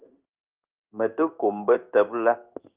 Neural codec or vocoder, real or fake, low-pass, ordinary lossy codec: codec, 16 kHz in and 24 kHz out, 1 kbps, XY-Tokenizer; fake; 3.6 kHz; Opus, 24 kbps